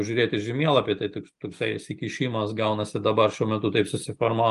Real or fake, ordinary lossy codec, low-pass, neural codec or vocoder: real; Opus, 32 kbps; 10.8 kHz; none